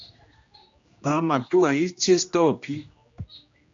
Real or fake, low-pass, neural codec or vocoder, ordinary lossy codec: fake; 7.2 kHz; codec, 16 kHz, 1 kbps, X-Codec, HuBERT features, trained on general audio; AAC, 64 kbps